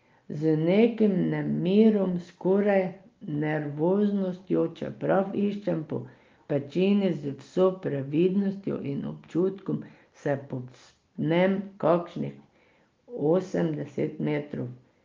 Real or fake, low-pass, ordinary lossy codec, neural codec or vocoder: real; 7.2 kHz; Opus, 24 kbps; none